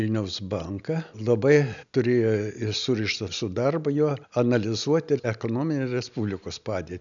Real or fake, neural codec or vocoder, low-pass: real; none; 7.2 kHz